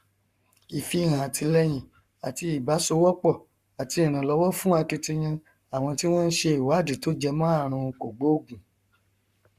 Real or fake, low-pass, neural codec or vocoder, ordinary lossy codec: fake; 14.4 kHz; codec, 44.1 kHz, 7.8 kbps, Pupu-Codec; Opus, 64 kbps